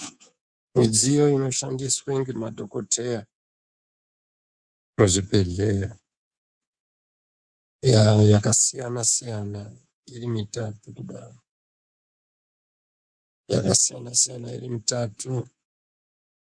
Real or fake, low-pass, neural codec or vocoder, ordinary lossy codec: fake; 9.9 kHz; codec, 24 kHz, 3.1 kbps, DualCodec; Opus, 64 kbps